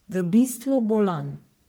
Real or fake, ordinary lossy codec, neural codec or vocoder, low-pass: fake; none; codec, 44.1 kHz, 1.7 kbps, Pupu-Codec; none